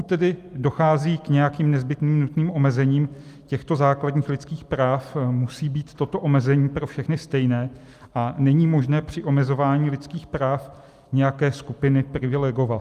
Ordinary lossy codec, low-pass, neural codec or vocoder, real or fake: Opus, 32 kbps; 14.4 kHz; none; real